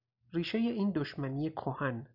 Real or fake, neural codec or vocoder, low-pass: real; none; 5.4 kHz